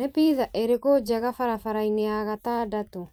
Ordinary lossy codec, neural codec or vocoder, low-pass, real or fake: none; vocoder, 44.1 kHz, 128 mel bands every 512 samples, BigVGAN v2; none; fake